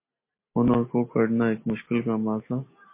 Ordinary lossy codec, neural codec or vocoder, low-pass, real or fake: MP3, 24 kbps; none; 3.6 kHz; real